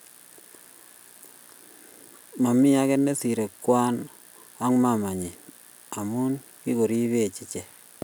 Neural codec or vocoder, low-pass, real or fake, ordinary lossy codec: none; none; real; none